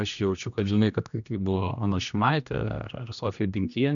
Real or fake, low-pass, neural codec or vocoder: fake; 7.2 kHz; codec, 16 kHz, 1 kbps, X-Codec, HuBERT features, trained on general audio